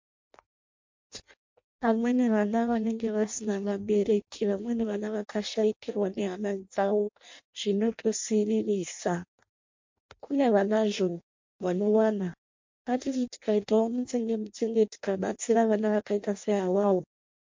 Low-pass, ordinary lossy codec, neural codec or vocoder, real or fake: 7.2 kHz; MP3, 48 kbps; codec, 16 kHz in and 24 kHz out, 0.6 kbps, FireRedTTS-2 codec; fake